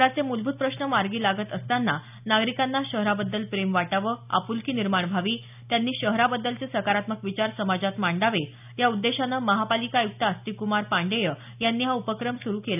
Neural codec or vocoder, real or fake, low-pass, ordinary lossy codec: none; real; 3.6 kHz; none